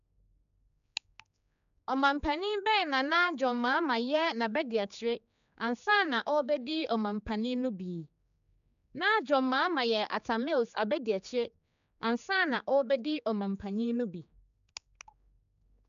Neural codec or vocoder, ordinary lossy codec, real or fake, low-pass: codec, 16 kHz, 4 kbps, X-Codec, HuBERT features, trained on general audio; none; fake; 7.2 kHz